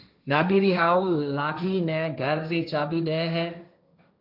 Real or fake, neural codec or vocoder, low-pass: fake; codec, 16 kHz, 1.1 kbps, Voila-Tokenizer; 5.4 kHz